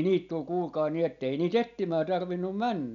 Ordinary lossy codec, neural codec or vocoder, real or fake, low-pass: none; none; real; 7.2 kHz